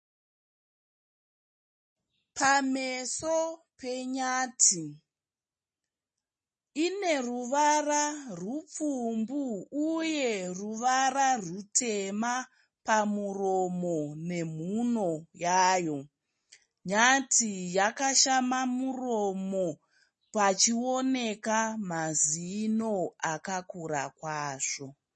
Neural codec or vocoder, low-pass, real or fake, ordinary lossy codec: none; 9.9 kHz; real; MP3, 32 kbps